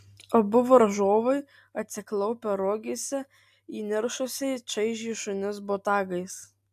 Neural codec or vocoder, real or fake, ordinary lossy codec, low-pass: none; real; AAC, 96 kbps; 14.4 kHz